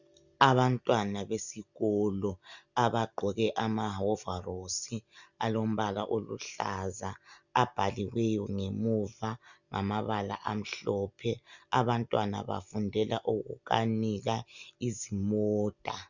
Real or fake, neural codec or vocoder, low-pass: real; none; 7.2 kHz